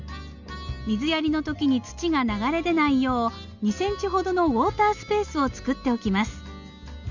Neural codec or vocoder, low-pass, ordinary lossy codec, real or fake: none; 7.2 kHz; none; real